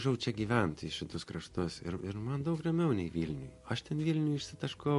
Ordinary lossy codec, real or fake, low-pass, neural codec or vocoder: MP3, 48 kbps; real; 14.4 kHz; none